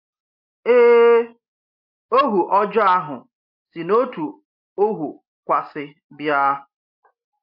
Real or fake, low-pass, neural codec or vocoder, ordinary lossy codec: real; 5.4 kHz; none; MP3, 48 kbps